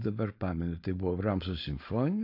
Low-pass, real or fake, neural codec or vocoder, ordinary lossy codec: 5.4 kHz; fake; codec, 16 kHz, 4.8 kbps, FACodec; AAC, 32 kbps